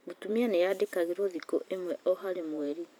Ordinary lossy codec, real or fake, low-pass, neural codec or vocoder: none; fake; none; vocoder, 44.1 kHz, 128 mel bands every 512 samples, BigVGAN v2